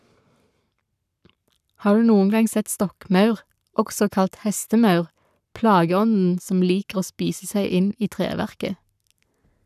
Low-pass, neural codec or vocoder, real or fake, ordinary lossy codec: 14.4 kHz; codec, 44.1 kHz, 7.8 kbps, Pupu-Codec; fake; none